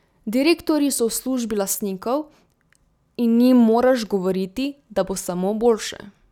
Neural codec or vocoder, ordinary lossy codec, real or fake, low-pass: none; none; real; 19.8 kHz